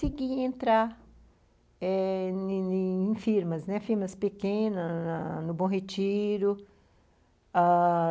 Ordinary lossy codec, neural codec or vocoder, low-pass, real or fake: none; none; none; real